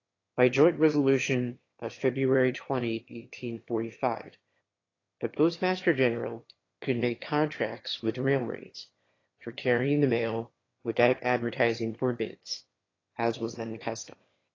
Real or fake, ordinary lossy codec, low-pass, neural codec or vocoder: fake; AAC, 32 kbps; 7.2 kHz; autoencoder, 22.05 kHz, a latent of 192 numbers a frame, VITS, trained on one speaker